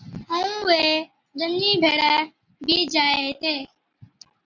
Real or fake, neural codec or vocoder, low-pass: real; none; 7.2 kHz